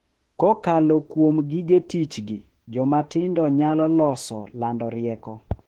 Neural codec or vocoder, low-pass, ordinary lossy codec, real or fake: autoencoder, 48 kHz, 32 numbers a frame, DAC-VAE, trained on Japanese speech; 19.8 kHz; Opus, 16 kbps; fake